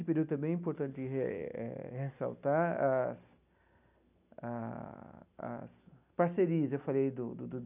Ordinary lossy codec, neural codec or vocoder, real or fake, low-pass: none; none; real; 3.6 kHz